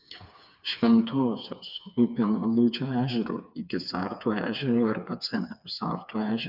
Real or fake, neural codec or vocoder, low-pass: fake; codec, 16 kHz, 4 kbps, FreqCodec, smaller model; 5.4 kHz